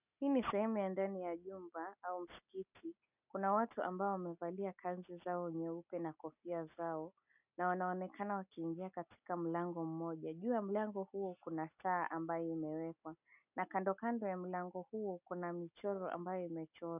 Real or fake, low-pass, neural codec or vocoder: real; 3.6 kHz; none